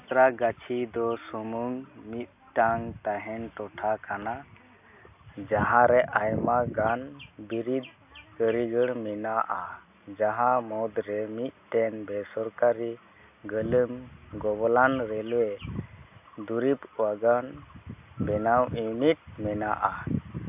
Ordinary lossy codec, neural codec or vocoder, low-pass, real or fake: AAC, 32 kbps; none; 3.6 kHz; real